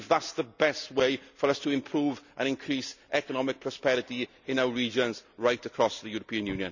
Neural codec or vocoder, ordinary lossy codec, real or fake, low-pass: none; none; real; 7.2 kHz